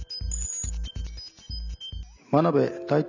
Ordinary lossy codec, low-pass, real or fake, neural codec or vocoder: none; 7.2 kHz; real; none